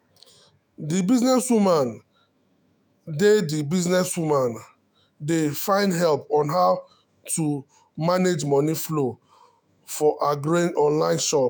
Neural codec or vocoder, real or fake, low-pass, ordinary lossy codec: autoencoder, 48 kHz, 128 numbers a frame, DAC-VAE, trained on Japanese speech; fake; none; none